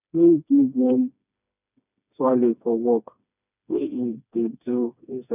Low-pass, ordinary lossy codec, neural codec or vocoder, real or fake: 3.6 kHz; none; codec, 16 kHz, 2 kbps, FreqCodec, smaller model; fake